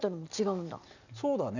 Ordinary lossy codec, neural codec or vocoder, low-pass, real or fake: none; none; 7.2 kHz; real